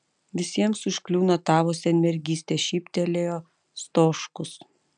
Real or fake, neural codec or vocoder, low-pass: real; none; 9.9 kHz